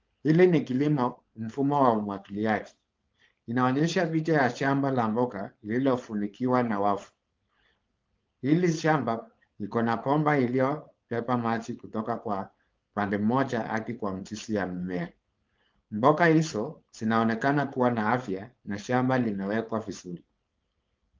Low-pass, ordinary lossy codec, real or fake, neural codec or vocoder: 7.2 kHz; Opus, 24 kbps; fake; codec, 16 kHz, 4.8 kbps, FACodec